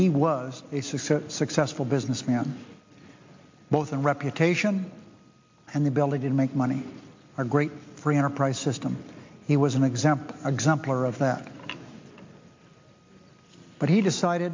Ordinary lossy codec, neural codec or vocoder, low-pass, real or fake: MP3, 48 kbps; none; 7.2 kHz; real